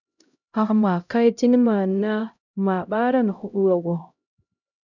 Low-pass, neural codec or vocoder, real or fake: 7.2 kHz; codec, 16 kHz, 0.5 kbps, X-Codec, HuBERT features, trained on LibriSpeech; fake